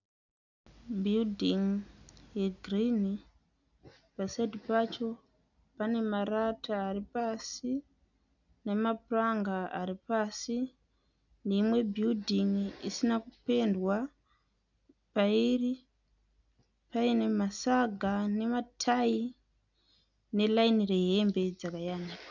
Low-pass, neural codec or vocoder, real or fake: 7.2 kHz; none; real